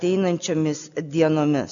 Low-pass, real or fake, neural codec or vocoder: 7.2 kHz; real; none